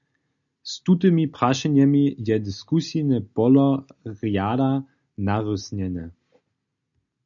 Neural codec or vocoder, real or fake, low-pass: none; real; 7.2 kHz